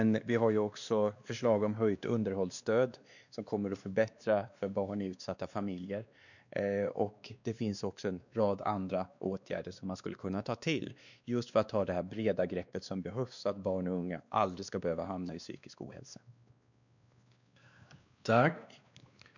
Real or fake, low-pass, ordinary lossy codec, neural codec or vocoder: fake; 7.2 kHz; none; codec, 16 kHz, 2 kbps, X-Codec, WavLM features, trained on Multilingual LibriSpeech